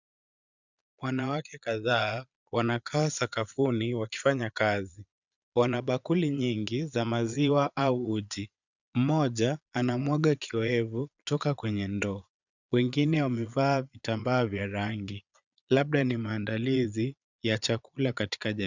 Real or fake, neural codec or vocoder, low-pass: fake; vocoder, 22.05 kHz, 80 mel bands, Vocos; 7.2 kHz